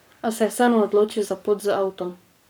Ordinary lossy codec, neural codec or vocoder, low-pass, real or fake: none; codec, 44.1 kHz, 7.8 kbps, Pupu-Codec; none; fake